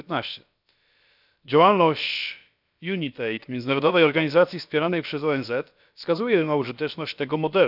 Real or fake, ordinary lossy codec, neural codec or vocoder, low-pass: fake; none; codec, 16 kHz, about 1 kbps, DyCAST, with the encoder's durations; 5.4 kHz